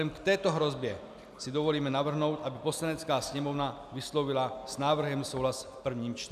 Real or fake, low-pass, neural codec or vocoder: real; 14.4 kHz; none